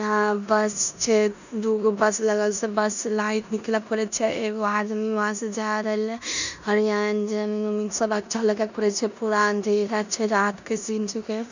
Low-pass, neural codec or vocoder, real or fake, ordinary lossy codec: 7.2 kHz; codec, 16 kHz in and 24 kHz out, 0.9 kbps, LongCat-Audio-Codec, four codebook decoder; fake; none